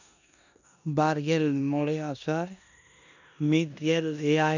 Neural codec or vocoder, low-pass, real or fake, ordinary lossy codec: codec, 16 kHz in and 24 kHz out, 0.9 kbps, LongCat-Audio-Codec, four codebook decoder; 7.2 kHz; fake; none